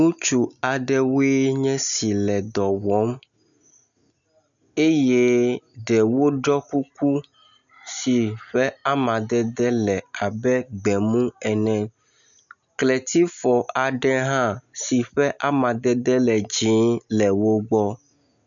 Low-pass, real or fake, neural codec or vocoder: 7.2 kHz; real; none